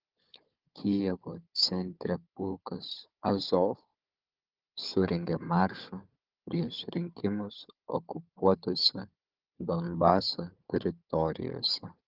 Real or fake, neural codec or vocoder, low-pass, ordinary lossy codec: fake; codec, 16 kHz, 4 kbps, FunCodec, trained on Chinese and English, 50 frames a second; 5.4 kHz; Opus, 32 kbps